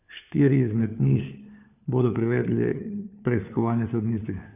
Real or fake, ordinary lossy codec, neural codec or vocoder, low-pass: fake; none; codec, 16 kHz, 4 kbps, FunCodec, trained on LibriTTS, 50 frames a second; 3.6 kHz